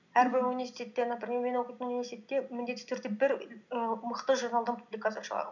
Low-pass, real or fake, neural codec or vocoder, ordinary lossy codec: 7.2 kHz; real; none; none